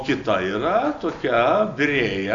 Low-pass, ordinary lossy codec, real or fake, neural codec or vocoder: 7.2 kHz; AAC, 64 kbps; real; none